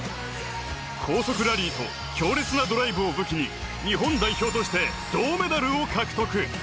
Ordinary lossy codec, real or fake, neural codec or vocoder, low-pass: none; real; none; none